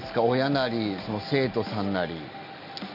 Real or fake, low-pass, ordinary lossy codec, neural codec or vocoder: real; 5.4 kHz; none; none